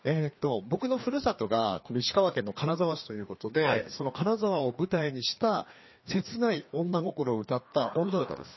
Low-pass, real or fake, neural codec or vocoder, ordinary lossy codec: 7.2 kHz; fake; codec, 16 kHz, 2 kbps, FreqCodec, larger model; MP3, 24 kbps